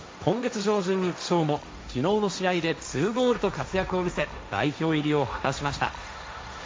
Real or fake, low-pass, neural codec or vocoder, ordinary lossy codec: fake; none; codec, 16 kHz, 1.1 kbps, Voila-Tokenizer; none